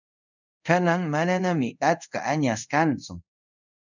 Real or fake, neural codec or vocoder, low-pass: fake; codec, 24 kHz, 0.5 kbps, DualCodec; 7.2 kHz